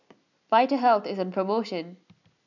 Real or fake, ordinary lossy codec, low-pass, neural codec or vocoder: real; none; 7.2 kHz; none